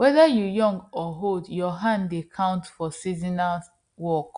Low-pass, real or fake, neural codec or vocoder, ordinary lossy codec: 9.9 kHz; real; none; Opus, 64 kbps